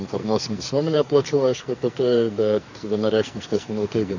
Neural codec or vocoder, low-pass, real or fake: codec, 44.1 kHz, 2.6 kbps, SNAC; 7.2 kHz; fake